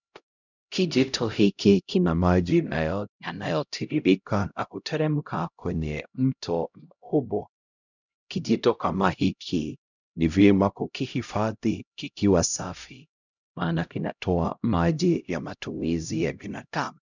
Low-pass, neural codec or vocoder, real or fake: 7.2 kHz; codec, 16 kHz, 0.5 kbps, X-Codec, HuBERT features, trained on LibriSpeech; fake